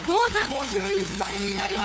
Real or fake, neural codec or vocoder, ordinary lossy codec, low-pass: fake; codec, 16 kHz, 2 kbps, FunCodec, trained on LibriTTS, 25 frames a second; none; none